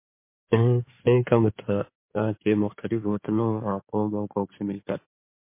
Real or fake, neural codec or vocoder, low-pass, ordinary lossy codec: fake; codec, 16 kHz in and 24 kHz out, 2.2 kbps, FireRedTTS-2 codec; 3.6 kHz; MP3, 24 kbps